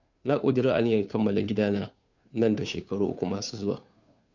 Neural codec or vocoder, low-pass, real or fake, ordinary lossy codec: codec, 16 kHz, 2 kbps, FunCodec, trained on Chinese and English, 25 frames a second; 7.2 kHz; fake; none